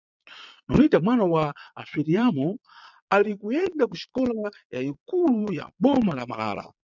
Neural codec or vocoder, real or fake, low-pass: vocoder, 22.05 kHz, 80 mel bands, Vocos; fake; 7.2 kHz